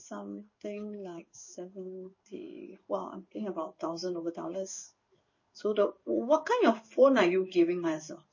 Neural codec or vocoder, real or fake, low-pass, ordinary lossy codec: codec, 16 kHz in and 24 kHz out, 1 kbps, XY-Tokenizer; fake; 7.2 kHz; MP3, 32 kbps